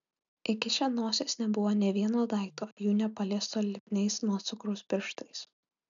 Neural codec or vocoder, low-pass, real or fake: none; 7.2 kHz; real